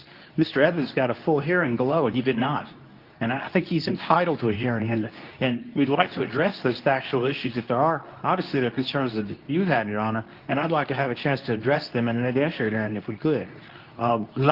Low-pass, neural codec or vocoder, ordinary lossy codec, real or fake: 5.4 kHz; codec, 24 kHz, 0.9 kbps, WavTokenizer, medium speech release version 2; Opus, 32 kbps; fake